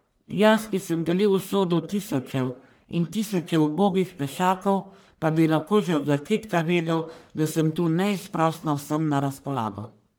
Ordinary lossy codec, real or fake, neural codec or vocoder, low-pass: none; fake; codec, 44.1 kHz, 1.7 kbps, Pupu-Codec; none